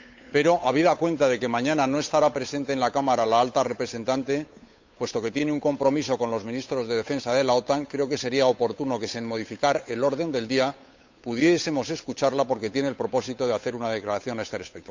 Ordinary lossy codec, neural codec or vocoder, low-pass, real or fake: MP3, 64 kbps; codec, 16 kHz, 8 kbps, FunCodec, trained on Chinese and English, 25 frames a second; 7.2 kHz; fake